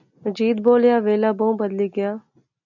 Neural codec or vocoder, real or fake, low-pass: none; real; 7.2 kHz